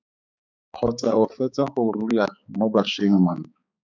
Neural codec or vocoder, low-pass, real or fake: codec, 16 kHz, 4 kbps, X-Codec, HuBERT features, trained on balanced general audio; 7.2 kHz; fake